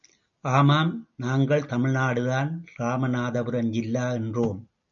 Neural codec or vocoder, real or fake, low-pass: none; real; 7.2 kHz